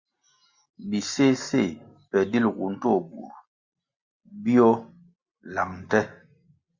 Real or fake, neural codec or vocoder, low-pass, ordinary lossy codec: real; none; 7.2 kHz; Opus, 64 kbps